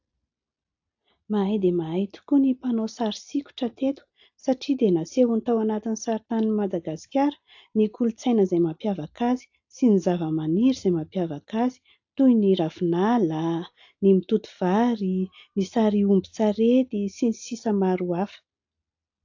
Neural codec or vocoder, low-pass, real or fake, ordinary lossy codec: none; 7.2 kHz; real; AAC, 48 kbps